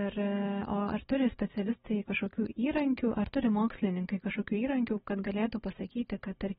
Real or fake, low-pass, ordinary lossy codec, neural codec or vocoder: real; 19.8 kHz; AAC, 16 kbps; none